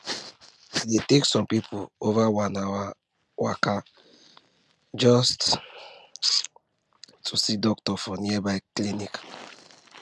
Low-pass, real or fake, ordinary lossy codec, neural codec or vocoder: none; real; none; none